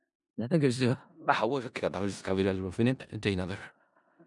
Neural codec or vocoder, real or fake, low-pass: codec, 16 kHz in and 24 kHz out, 0.4 kbps, LongCat-Audio-Codec, four codebook decoder; fake; 10.8 kHz